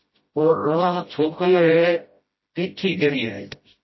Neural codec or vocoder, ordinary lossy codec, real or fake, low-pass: codec, 16 kHz, 0.5 kbps, FreqCodec, smaller model; MP3, 24 kbps; fake; 7.2 kHz